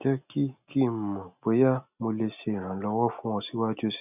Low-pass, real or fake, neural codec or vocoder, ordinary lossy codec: 3.6 kHz; real; none; none